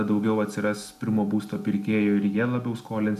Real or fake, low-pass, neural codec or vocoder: real; 14.4 kHz; none